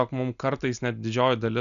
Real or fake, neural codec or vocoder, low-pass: real; none; 7.2 kHz